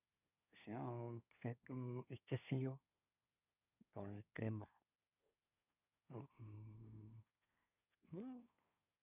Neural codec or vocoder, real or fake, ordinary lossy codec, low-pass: codec, 24 kHz, 1 kbps, SNAC; fake; none; 3.6 kHz